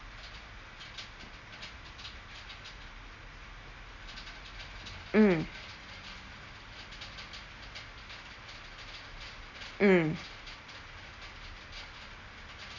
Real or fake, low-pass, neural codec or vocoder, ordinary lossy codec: real; 7.2 kHz; none; none